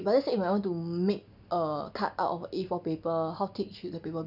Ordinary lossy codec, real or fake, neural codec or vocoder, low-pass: none; real; none; 5.4 kHz